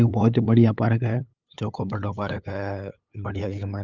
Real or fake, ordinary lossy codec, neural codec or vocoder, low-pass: fake; Opus, 32 kbps; codec, 16 kHz, 8 kbps, FunCodec, trained on LibriTTS, 25 frames a second; 7.2 kHz